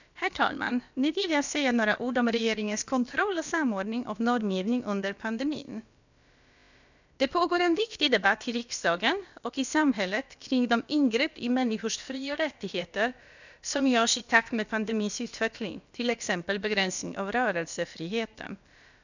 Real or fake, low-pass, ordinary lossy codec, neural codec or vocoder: fake; 7.2 kHz; none; codec, 16 kHz, about 1 kbps, DyCAST, with the encoder's durations